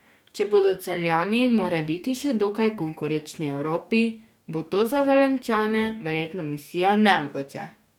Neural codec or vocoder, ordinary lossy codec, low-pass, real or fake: codec, 44.1 kHz, 2.6 kbps, DAC; none; 19.8 kHz; fake